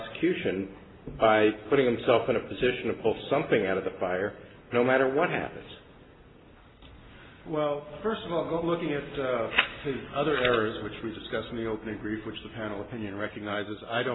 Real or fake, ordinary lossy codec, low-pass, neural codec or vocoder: real; AAC, 16 kbps; 7.2 kHz; none